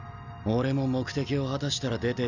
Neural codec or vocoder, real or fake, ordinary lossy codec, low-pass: none; real; none; 7.2 kHz